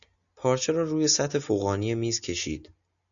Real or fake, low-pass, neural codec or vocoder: real; 7.2 kHz; none